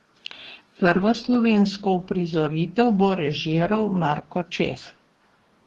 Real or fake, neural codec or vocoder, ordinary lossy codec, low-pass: fake; codec, 44.1 kHz, 2.6 kbps, DAC; Opus, 16 kbps; 19.8 kHz